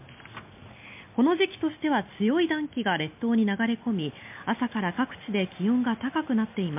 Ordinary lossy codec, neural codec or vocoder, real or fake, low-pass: MP3, 32 kbps; none; real; 3.6 kHz